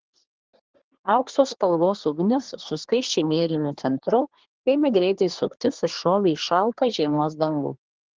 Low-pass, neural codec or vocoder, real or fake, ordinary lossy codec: 7.2 kHz; codec, 24 kHz, 1 kbps, SNAC; fake; Opus, 16 kbps